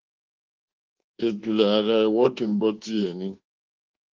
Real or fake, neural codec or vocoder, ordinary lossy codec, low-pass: fake; codec, 24 kHz, 1.2 kbps, DualCodec; Opus, 16 kbps; 7.2 kHz